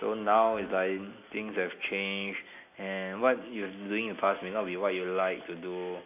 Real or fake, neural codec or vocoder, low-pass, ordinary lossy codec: real; none; 3.6 kHz; AAC, 32 kbps